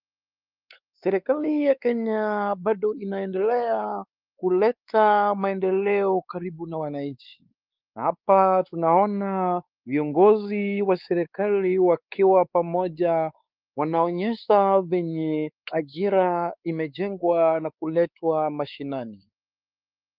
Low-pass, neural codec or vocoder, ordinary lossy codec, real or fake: 5.4 kHz; codec, 16 kHz, 4 kbps, X-Codec, WavLM features, trained on Multilingual LibriSpeech; Opus, 24 kbps; fake